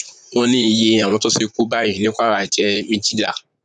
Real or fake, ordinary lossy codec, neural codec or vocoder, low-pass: fake; none; vocoder, 44.1 kHz, 128 mel bands, Pupu-Vocoder; 10.8 kHz